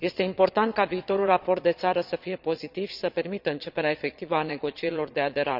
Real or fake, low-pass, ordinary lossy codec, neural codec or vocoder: fake; 5.4 kHz; none; vocoder, 22.05 kHz, 80 mel bands, Vocos